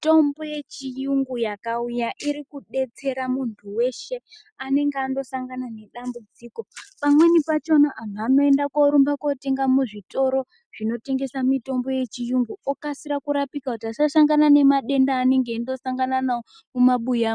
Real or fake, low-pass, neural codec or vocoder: real; 9.9 kHz; none